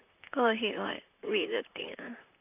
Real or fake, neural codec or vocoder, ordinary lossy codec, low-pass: fake; vocoder, 44.1 kHz, 128 mel bands, Pupu-Vocoder; none; 3.6 kHz